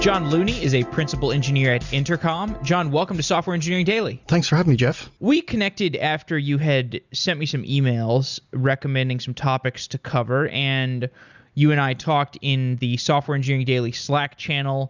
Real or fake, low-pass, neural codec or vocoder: real; 7.2 kHz; none